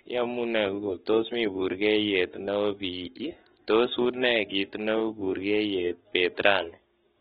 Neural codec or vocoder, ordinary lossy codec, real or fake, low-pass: codec, 44.1 kHz, 7.8 kbps, Pupu-Codec; AAC, 16 kbps; fake; 19.8 kHz